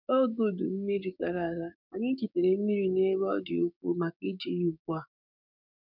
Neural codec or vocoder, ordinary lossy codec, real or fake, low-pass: codec, 16 kHz, 6 kbps, DAC; none; fake; 5.4 kHz